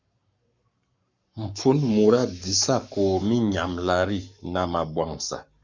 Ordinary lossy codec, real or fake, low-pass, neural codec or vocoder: Opus, 64 kbps; fake; 7.2 kHz; codec, 44.1 kHz, 7.8 kbps, Pupu-Codec